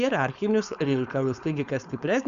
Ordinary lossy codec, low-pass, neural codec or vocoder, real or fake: Opus, 64 kbps; 7.2 kHz; codec, 16 kHz, 4.8 kbps, FACodec; fake